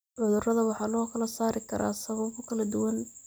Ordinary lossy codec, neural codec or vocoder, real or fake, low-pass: none; none; real; none